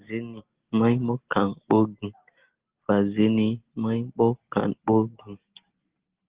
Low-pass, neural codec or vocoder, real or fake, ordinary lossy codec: 3.6 kHz; none; real; Opus, 16 kbps